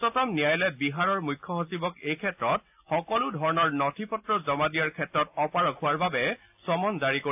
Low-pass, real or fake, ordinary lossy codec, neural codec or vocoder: 3.6 kHz; real; AAC, 32 kbps; none